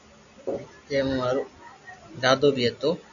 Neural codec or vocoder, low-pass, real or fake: none; 7.2 kHz; real